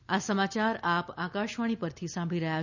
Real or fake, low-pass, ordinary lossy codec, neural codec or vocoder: real; 7.2 kHz; none; none